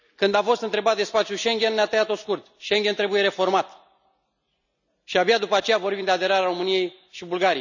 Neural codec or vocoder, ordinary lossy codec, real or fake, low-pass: none; none; real; 7.2 kHz